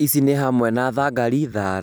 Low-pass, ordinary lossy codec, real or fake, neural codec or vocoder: none; none; real; none